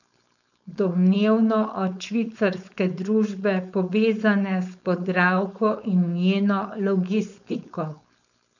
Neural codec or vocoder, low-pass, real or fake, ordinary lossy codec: codec, 16 kHz, 4.8 kbps, FACodec; 7.2 kHz; fake; none